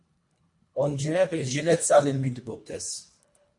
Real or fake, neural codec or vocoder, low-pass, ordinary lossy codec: fake; codec, 24 kHz, 1.5 kbps, HILCodec; 10.8 kHz; MP3, 48 kbps